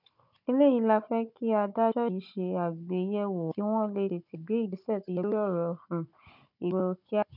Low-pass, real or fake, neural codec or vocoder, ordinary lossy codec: 5.4 kHz; fake; codec, 16 kHz, 16 kbps, FunCodec, trained on Chinese and English, 50 frames a second; none